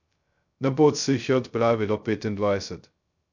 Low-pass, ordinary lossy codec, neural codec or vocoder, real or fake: 7.2 kHz; none; codec, 16 kHz, 0.2 kbps, FocalCodec; fake